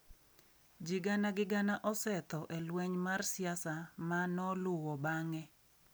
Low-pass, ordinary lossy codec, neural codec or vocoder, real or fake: none; none; none; real